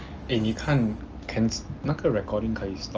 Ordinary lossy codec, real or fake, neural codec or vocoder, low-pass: Opus, 24 kbps; real; none; 7.2 kHz